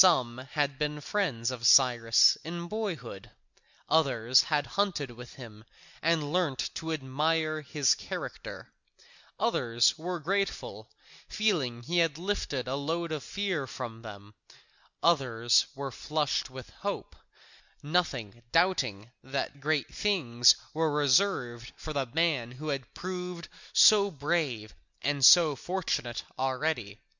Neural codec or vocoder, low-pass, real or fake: none; 7.2 kHz; real